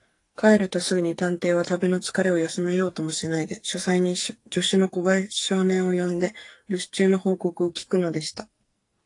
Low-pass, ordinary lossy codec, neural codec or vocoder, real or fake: 10.8 kHz; AAC, 48 kbps; codec, 44.1 kHz, 2.6 kbps, SNAC; fake